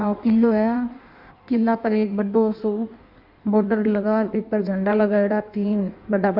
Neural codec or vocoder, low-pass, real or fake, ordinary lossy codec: codec, 16 kHz in and 24 kHz out, 1.1 kbps, FireRedTTS-2 codec; 5.4 kHz; fake; none